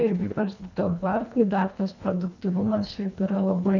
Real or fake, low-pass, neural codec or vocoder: fake; 7.2 kHz; codec, 24 kHz, 1.5 kbps, HILCodec